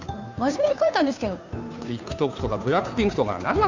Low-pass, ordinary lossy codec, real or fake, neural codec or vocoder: 7.2 kHz; Opus, 64 kbps; fake; codec, 16 kHz, 2 kbps, FunCodec, trained on Chinese and English, 25 frames a second